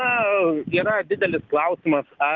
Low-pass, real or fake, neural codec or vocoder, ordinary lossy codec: 7.2 kHz; real; none; Opus, 32 kbps